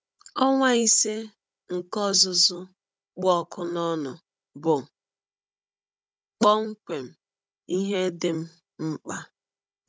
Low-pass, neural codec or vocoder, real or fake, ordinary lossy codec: none; codec, 16 kHz, 16 kbps, FunCodec, trained on Chinese and English, 50 frames a second; fake; none